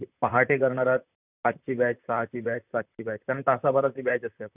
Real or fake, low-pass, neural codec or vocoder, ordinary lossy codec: fake; 3.6 kHz; vocoder, 44.1 kHz, 128 mel bands, Pupu-Vocoder; none